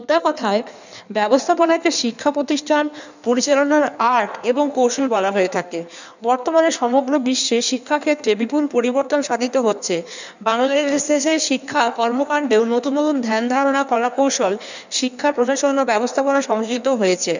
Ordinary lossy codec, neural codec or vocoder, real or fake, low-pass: none; codec, 16 kHz in and 24 kHz out, 1.1 kbps, FireRedTTS-2 codec; fake; 7.2 kHz